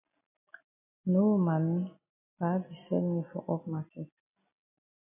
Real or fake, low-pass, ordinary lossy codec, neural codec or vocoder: real; 3.6 kHz; AAC, 24 kbps; none